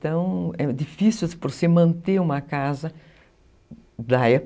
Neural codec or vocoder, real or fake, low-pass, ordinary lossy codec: none; real; none; none